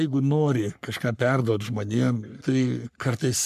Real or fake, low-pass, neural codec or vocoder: fake; 14.4 kHz; codec, 44.1 kHz, 3.4 kbps, Pupu-Codec